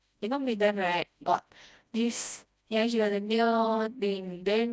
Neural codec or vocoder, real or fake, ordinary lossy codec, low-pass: codec, 16 kHz, 1 kbps, FreqCodec, smaller model; fake; none; none